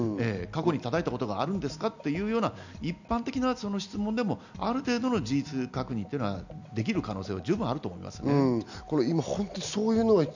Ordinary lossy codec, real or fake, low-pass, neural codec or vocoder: none; real; 7.2 kHz; none